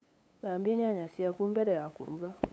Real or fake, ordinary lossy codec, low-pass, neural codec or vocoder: fake; none; none; codec, 16 kHz, 8 kbps, FunCodec, trained on LibriTTS, 25 frames a second